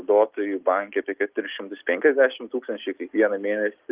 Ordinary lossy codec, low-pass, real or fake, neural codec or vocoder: Opus, 32 kbps; 3.6 kHz; real; none